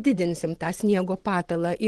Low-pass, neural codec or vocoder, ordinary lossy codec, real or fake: 10.8 kHz; vocoder, 24 kHz, 100 mel bands, Vocos; Opus, 16 kbps; fake